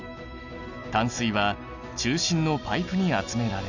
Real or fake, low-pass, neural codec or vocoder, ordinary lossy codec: real; 7.2 kHz; none; none